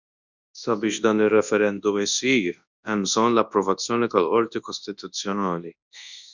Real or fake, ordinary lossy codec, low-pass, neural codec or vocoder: fake; Opus, 64 kbps; 7.2 kHz; codec, 24 kHz, 0.9 kbps, WavTokenizer, large speech release